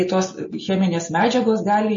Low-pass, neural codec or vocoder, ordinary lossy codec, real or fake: 7.2 kHz; none; MP3, 32 kbps; real